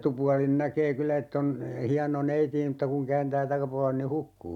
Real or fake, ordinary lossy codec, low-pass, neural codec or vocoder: real; none; 19.8 kHz; none